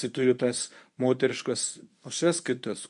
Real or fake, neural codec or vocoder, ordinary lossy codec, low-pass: fake; codec, 24 kHz, 0.9 kbps, WavTokenizer, medium speech release version 1; MP3, 96 kbps; 10.8 kHz